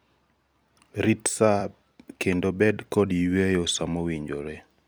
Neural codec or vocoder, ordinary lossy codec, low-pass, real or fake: vocoder, 44.1 kHz, 128 mel bands every 256 samples, BigVGAN v2; none; none; fake